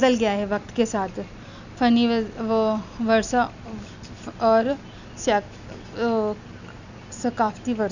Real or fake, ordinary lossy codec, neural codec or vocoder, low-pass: real; none; none; 7.2 kHz